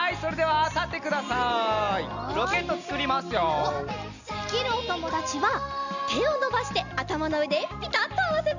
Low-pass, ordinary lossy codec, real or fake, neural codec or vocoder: 7.2 kHz; none; real; none